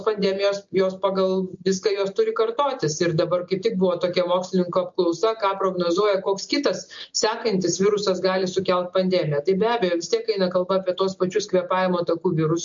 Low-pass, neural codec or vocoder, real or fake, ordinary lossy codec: 7.2 kHz; none; real; MP3, 48 kbps